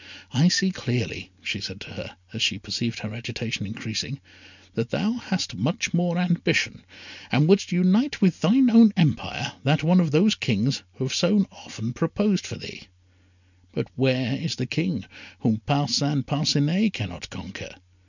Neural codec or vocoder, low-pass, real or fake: none; 7.2 kHz; real